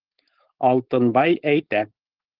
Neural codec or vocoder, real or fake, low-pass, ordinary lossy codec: codec, 16 kHz, 4.8 kbps, FACodec; fake; 5.4 kHz; Opus, 16 kbps